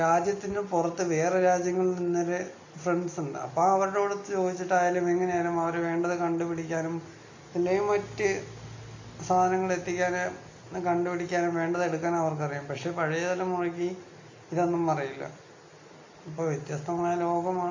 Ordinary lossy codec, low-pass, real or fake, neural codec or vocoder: AAC, 48 kbps; 7.2 kHz; real; none